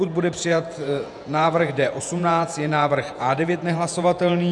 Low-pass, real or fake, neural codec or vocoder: 10.8 kHz; fake; vocoder, 48 kHz, 128 mel bands, Vocos